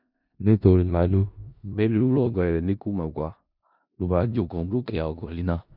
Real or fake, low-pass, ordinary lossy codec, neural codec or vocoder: fake; 5.4 kHz; none; codec, 16 kHz in and 24 kHz out, 0.4 kbps, LongCat-Audio-Codec, four codebook decoder